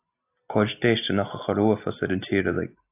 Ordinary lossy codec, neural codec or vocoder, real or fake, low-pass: AAC, 24 kbps; none; real; 3.6 kHz